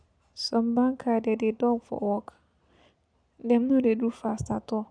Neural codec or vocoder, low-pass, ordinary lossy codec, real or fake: none; 9.9 kHz; none; real